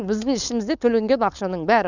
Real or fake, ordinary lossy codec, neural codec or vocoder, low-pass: fake; none; codec, 16 kHz, 4.8 kbps, FACodec; 7.2 kHz